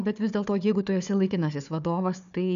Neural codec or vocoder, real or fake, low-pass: codec, 16 kHz, 4 kbps, FunCodec, trained on Chinese and English, 50 frames a second; fake; 7.2 kHz